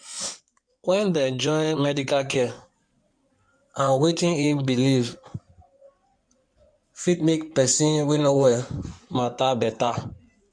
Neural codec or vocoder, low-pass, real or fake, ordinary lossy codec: codec, 16 kHz in and 24 kHz out, 2.2 kbps, FireRedTTS-2 codec; 9.9 kHz; fake; MP3, 64 kbps